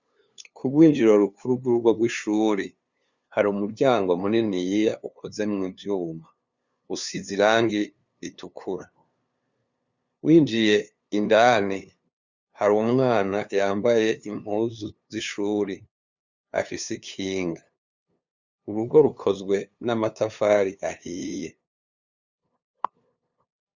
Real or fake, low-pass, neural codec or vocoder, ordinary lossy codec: fake; 7.2 kHz; codec, 16 kHz, 2 kbps, FunCodec, trained on LibriTTS, 25 frames a second; Opus, 64 kbps